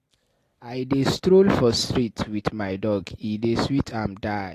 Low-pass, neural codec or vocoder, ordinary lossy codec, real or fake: 14.4 kHz; none; AAC, 48 kbps; real